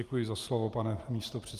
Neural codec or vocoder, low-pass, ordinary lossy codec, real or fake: none; 14.4 kHz; Opus, 32 kbps; real